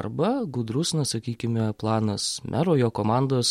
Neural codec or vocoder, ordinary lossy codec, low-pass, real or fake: none; MP3, 64 kbps; 19.8 kHz; real